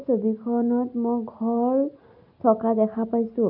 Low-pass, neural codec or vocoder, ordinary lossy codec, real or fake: 5.4 kHz; none; none; real